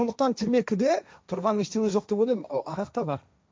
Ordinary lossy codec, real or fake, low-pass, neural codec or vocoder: none; fake; none; codec, 16 kHz, 1.1 kbps, Voila-Tokenizer